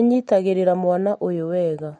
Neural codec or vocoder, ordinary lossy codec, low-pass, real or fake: none; MP3, 48 kbps; 19.8 kHz; real